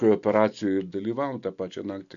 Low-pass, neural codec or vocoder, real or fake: 7.2 kHz; none; real